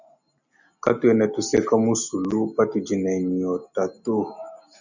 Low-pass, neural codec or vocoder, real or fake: 7.2 kHz; none; real